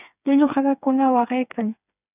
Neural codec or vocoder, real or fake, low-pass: codec, 16 kHz, 4 kbps, FreqCodec, smaller model; fake; 3.6 kHz